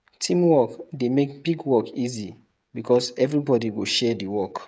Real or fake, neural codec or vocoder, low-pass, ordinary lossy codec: fake; codec, 16 kHz, 16 kbps, FreqCodec, smaller model; none; none